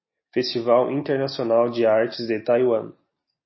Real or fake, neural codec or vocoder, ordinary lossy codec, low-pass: real; none; MP3, 24 kbps; 7.2 kHz